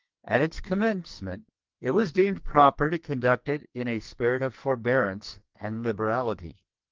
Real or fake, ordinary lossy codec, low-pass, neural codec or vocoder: fake; Opus, 32 kbps; 7.2 kHz; codec, 44.1 kHz, 2.6 kbps, SNAC